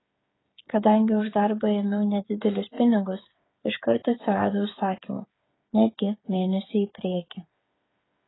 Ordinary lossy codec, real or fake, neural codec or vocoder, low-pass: AAC, 16 kbps; fake; codec, 16 kHz, 8 kbps, FreqCodec, smaller model; 7.2 kHz